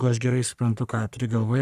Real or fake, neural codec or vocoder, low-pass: fake; codec, 44.1 kHz, 3.4 kbps, Pupu-Codec; 14.4 kHz